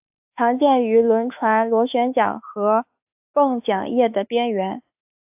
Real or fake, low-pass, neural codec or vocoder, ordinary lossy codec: fake; 3.6 kHz; autoencoder, 48 kHz, 32 numbers a frame, DAC-VAE, trained on Japanese speech; AAC, 32 kbps